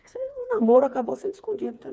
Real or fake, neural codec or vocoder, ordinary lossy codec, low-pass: fake; codec, 16 kHz, 4 kbps, FreqCodec, smaller model; none; none